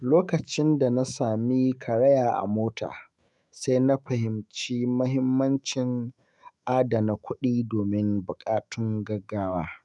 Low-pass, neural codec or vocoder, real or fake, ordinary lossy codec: 10.8 kHz; autoencoder, 48 kHz, 128 numbers a frame, DAC-VAE, trained on Japanese speech; fake; none